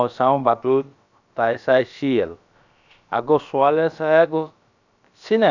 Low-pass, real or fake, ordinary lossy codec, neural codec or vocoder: 7.2 kHz; fake; none; codec, 16 kHz, about 1 kbps, DyCAST, with the encoder's durations